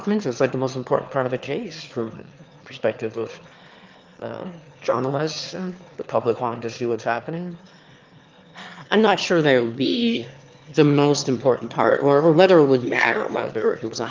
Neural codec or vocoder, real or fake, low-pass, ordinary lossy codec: autoencoder, 22.05 kHz, a latent of 192 numbers a frame, VITS, trained on one speaker; fake; 7.2 kHz; Opus, 32 kbps